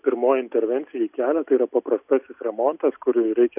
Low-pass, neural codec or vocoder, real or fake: 3.6 kHz; none; real